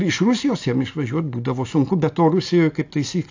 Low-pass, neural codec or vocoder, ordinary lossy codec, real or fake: 7.2 kHz; none; AAC, 48 kbps; real